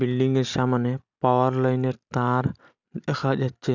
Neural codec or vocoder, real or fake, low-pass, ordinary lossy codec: none; real; 7.2 kHz; none